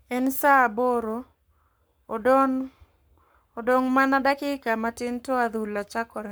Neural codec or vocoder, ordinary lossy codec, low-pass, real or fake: codec, 44.1 kHz, 7.8 kbps, Pupu-Codec; none; none; fake